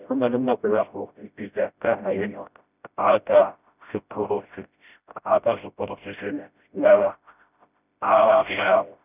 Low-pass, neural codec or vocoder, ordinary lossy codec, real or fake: 3.6 kHz; codec, 16 kHz, 0.5 kbps, FreqCodec, smaller model; none; fake